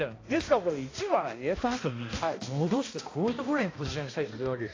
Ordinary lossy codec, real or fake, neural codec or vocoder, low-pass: AAC, 32 kbps; fake; codec, 16 kHz, 1 kbps, X-Codec, HuBERT features, trained on balanced general audio; 7.2 kHz